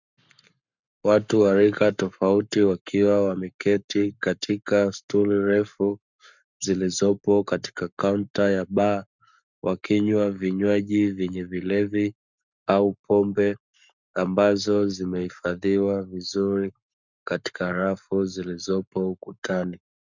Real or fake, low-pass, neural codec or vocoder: fake; 7.2 kHz; codec, 44.1 kHz, 7.8 kbps, Pupu-Codec